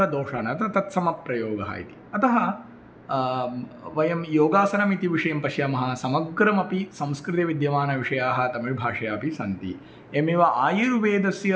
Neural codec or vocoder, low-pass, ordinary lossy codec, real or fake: none; none; none; real